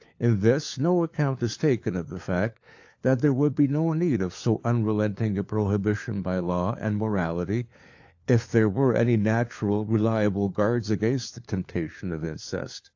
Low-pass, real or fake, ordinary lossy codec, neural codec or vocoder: 7.2 kHz; fake; AAC, 48 kbps; codec, 16 kHz, 4 kbps, FunCodec, trained on LibriTTS, 50 frames a second